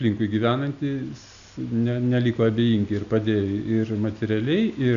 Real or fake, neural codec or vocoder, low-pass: real; none; 7.2 kHz